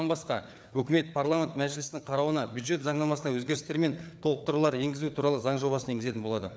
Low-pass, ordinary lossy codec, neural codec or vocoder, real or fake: none; none; codec, 16 kHz, 4 kbps, FreqCodec, larger model; fake